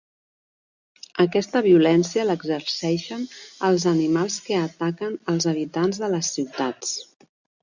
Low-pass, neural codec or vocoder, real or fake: 7.2 kHz; none; real